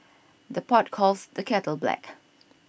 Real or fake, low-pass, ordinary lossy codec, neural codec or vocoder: real; none; none; none